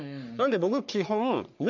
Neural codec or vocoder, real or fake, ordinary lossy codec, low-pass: codec, 44.1 kHz, 3.4 kbps, Pupu-Codec; fake; none; 7.2 kHz